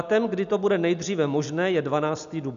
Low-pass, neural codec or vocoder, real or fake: 7.2 kHz; none; real